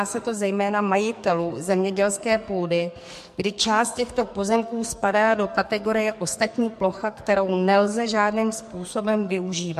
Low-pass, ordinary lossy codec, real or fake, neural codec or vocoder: 14.4 kHz; MP3, 64 kbps; fake; codec, 44.1 kHz, 2.6 kbps, SNAC